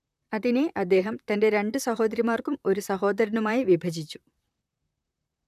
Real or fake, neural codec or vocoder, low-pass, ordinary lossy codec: fake; vocoder, 44.1 kHz, 128 mel bands, Pupu-Vocoder; 14.4 kHz; none